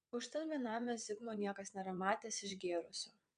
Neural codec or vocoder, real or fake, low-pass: vocoder, 22.05 kHz, 80 mel bands, WaveNeXt; fake; 9.9 kHz